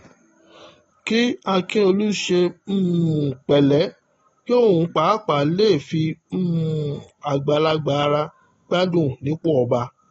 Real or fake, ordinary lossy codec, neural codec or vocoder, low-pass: real; AAC, 24 kbps; none; 19.8 kHz